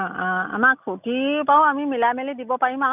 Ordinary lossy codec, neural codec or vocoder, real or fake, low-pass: none; none; real; 3.6 kHz